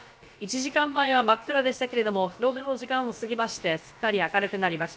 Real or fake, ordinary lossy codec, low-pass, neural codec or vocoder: fake; none; none; codec, 16 kHz, about 1 kbps, DyCAST, with the encoder's durations